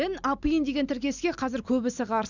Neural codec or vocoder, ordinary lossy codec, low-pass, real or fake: none; none; 7.2 kHz; real